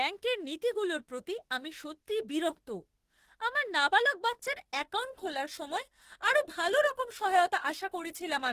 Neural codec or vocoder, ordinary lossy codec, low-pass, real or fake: autoencoder, 48 kHz, 32 numbers a frame, DAC-VAE, trained on Japanese speech; Opus, 16 kbps; 14.4 kHz; fake